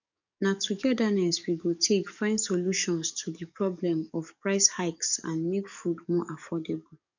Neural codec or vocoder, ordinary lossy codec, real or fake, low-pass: codec, 44.1 kHz, 7.8 kbps, DAC; none; fake; 7.2 kHz